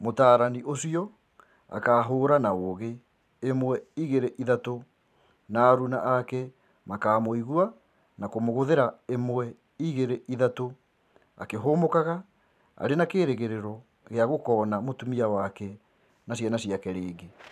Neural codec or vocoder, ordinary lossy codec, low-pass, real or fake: vocoder, 44.1 kHz, 128 mel bands every 512 samples, BigVGAN v2; none; 14.4 kHz; fake